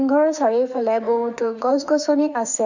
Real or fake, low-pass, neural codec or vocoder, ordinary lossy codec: fake; 7.2 kHz; autoencoder, 48 kHz, 32 numbers a frame, DAC-VAE, trained on Japanese speech; none